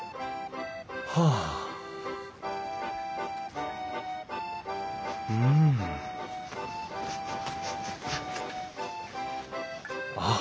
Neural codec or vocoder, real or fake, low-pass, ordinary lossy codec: none; real; none; none